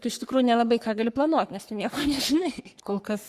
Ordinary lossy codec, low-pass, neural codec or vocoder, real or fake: Opus, 64 kbps; 14.4 kHz; codec, 44.1 kHz, 3.4 kbps, Pupu-Codec; fake